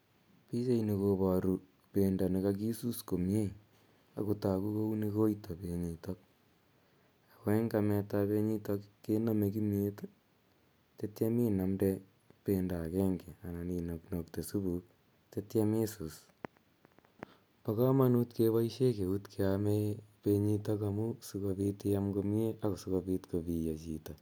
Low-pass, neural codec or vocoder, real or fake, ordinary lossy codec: none; none; real; none